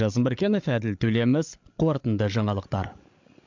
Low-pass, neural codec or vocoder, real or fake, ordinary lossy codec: 7.2 kHz; codec, 16 kHz, 8 kbps, FreqCodec, larger model; fake; MP3, 64 kbps